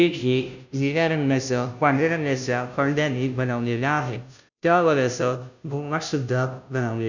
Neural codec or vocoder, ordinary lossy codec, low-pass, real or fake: codec, 16 kHz, 0.5 kbps, FunCodec, trained on Chinese and English, 25 frames a second; none; 7.2 kHz; fake